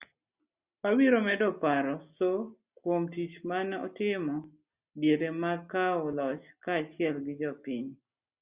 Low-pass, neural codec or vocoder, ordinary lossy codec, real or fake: 3.6 kHz; none; Opus, 64 kbps; real